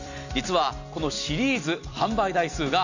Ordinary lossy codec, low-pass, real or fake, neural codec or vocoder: none; 7.2 kHz; fake; vocoder, 44.1 kHz, 128 mel bands every 256 samples, BigVGAN v2